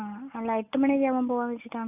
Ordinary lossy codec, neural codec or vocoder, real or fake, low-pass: none; none; real; 3.6 kHz